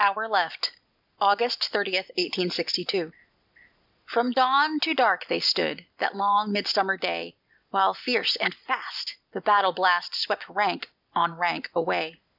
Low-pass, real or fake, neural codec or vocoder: 5.4 kHz; real; none